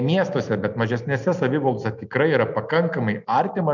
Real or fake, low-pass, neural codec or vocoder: real; 7.2 kHz; none